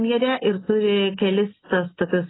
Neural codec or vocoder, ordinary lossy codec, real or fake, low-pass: none; AAC, 16 kbps; real; 7.2 kHz